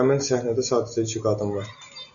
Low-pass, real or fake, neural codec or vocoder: 7.2 kHz; real; none